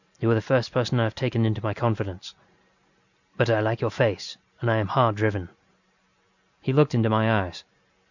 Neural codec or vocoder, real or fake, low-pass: none; real; 7.2 kHz